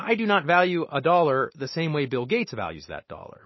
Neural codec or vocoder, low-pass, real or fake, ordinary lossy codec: none; 7.2 kHz; real; MP3, 24 kbps